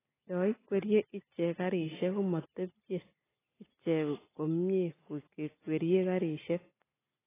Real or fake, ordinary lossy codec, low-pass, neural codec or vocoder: real; AAC, 16 kbps; 3.6 kHz; none